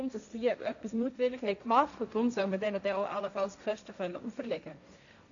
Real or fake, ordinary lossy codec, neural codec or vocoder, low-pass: fake; none; codec, 16 kHz, 1.1 kbps, Voila-Tokenizer; 7.2 kHz